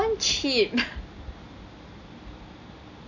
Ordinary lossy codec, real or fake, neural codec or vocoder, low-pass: none; real; none; 7.2 kHz